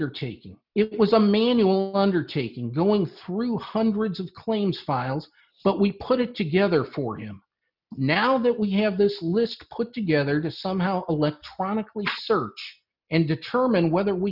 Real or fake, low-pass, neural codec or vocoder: real; 5.4 kHz; none